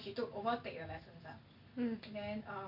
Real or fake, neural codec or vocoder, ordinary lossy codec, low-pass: real; none; none; 5.4 kHz